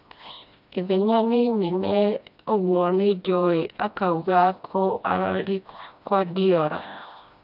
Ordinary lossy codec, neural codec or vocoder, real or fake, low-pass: none; codec, 16 kHz, 1 kbps, FreqCodec, smaller model; fake; 5.4 kHz